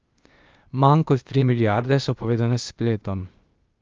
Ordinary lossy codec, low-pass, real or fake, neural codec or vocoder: Opus, 24 kbps; 7.2 kHz; fake; codec, 16 kHz, 0.8 kbps, ZipCodec